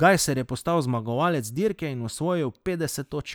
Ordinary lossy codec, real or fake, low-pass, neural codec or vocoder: none; real; none; none